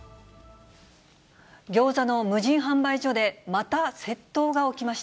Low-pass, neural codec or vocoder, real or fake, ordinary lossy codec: none; none; real; none